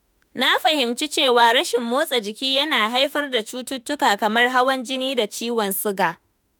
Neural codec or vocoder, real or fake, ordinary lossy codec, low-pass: autoencoder, 48 kHz, 32 numbers a frame, DAC-VAE, trained on Japanese speech; fake; none; none